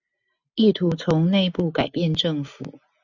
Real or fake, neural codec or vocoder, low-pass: real; none; 7.2 kHz